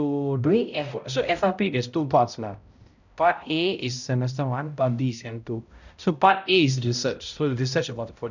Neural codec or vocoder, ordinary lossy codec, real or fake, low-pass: codec, 16 kHz, 0.5 kbps, X-Codec, HuBERT features, trained on balanced general audio; none; fake; 7.2 kHz